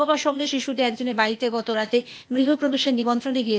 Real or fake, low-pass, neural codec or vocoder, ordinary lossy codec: fake; none; codec, 16 kHz, 0.8 kbps, ZipCodec; none